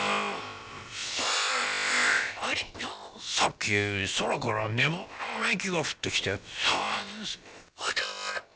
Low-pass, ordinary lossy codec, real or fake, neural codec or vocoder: none; none; fake; codec, 16 kHz, about 1 kbps, DyCAST, with the encoder's durations